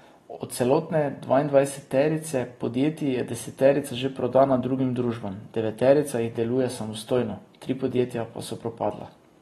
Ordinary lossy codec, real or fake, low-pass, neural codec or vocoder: AAC, 32 kbps; real; 19.8 kHz; none